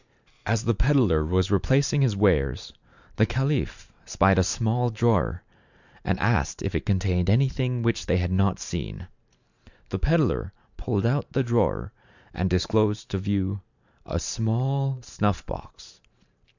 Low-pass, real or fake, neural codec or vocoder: 7.2 kHz; fake; vocoder, 44.1 kHz, 128 mel bands every 512 samples, BigVGAN v2